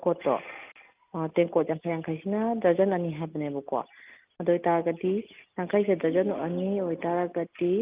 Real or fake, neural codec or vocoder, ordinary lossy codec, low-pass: real; none; Opus, 24 kbps; 3.6 kHz